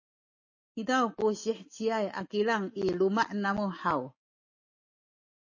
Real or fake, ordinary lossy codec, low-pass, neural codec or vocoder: real; MP3, 32 kbps; 7.2 kHz; none